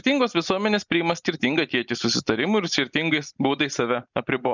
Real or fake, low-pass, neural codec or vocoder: real; 7.2 kHz; none